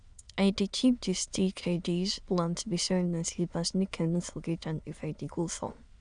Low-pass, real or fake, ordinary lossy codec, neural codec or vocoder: 9.9 kHz; fake; none; autoencoder, 22.05 kHz, a latent of 192 numbers a frame, VITS, trained on many speakers